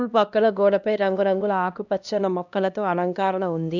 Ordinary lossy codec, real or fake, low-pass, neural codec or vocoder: none; fake; 7.2 kHz; codec, 16 kHz, 1 kbps, X-Codec, HuBERT features, trained on LibriSpeech